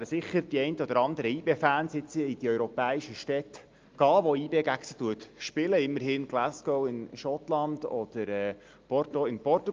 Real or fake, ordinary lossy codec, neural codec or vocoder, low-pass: real; Opus, 24 kbps; none; 7.2 kHz